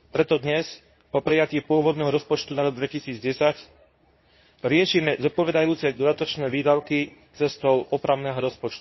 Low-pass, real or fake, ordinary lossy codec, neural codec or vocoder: 7.2 kHz; fake; MP3, 24 kbps; codec, 24 kHz, 0.9 kbps, WavTokenizer, medium speech release version 2